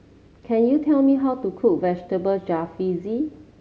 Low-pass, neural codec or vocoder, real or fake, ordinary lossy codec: none; none; real; none